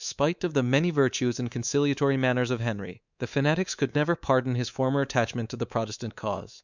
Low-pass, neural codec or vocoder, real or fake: 7.2 kHz; codec, 24 kHz, 3.1 kbps, DualCodec; fake